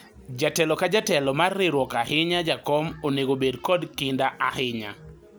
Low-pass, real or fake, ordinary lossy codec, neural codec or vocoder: none; real; none; none